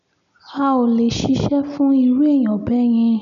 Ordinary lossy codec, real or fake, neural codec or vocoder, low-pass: none; real; none; 7.2 kHz